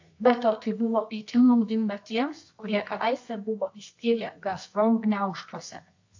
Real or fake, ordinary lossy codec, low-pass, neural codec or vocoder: fake; AAC, 48 kbps; 7.2 kHz; codec, 24 kHz, 0.9 kbps, WavTokenizer, medium music audio release